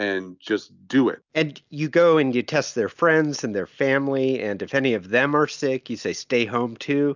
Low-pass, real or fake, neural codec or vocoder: 7.2 kHz; real; none